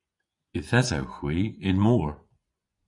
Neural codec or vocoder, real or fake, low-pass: vocoder, 24 kHz, 100 mel bands, Vocos; fake; 10.8 kHz